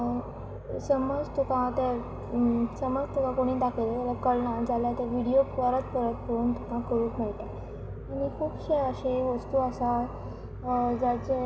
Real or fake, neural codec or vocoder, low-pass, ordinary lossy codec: real; none; none; none